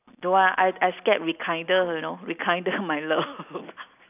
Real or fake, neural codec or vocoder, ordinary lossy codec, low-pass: real; none; none; 3.6 kHz